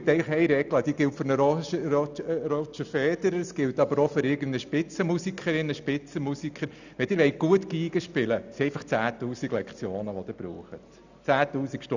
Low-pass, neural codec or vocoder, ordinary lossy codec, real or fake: 7.2 kHz; none; none; real